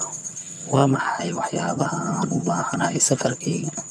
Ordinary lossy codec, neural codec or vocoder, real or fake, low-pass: none; vocoder, 22.05 kHz, 80 mel bands, HiFi-GAN; fake; none